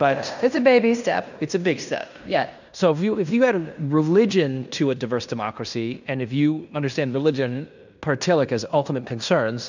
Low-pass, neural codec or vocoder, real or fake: 7.2 kHz; codec, 16 kHz in and 24 kHz out, 0.9 kbps, LongCat-Audio-Codec, fine tuned four codebook decoder; fake